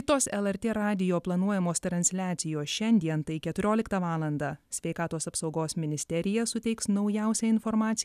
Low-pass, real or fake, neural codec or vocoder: 14.4 kHz; real; none